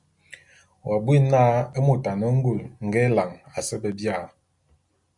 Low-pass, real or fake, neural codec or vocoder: 10.8 kHz; real; none